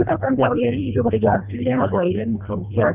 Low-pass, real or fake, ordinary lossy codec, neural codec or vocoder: 3.6 kHz; fake; none; codec, 24 kHz, 1.5 kbps, HILCodec